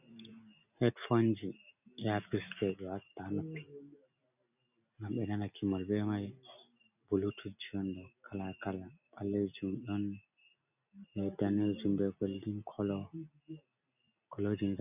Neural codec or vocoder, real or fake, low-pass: none; real; 3.6 kHz